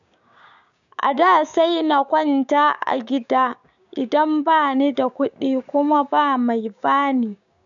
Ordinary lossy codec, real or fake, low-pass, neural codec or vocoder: none; fake; 7.2 kHz; codec, 16 kHz, 6 kbps, DAC